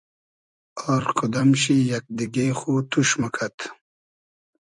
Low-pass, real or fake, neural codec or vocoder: 10.8 kHz; fake; vocoder, 44.1 kHz, 128 mel bands every 256 samples, BigVGAN v2